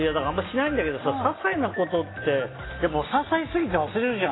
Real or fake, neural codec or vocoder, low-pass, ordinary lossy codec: real; none; 7.2 kHz; AAC, 16 kbps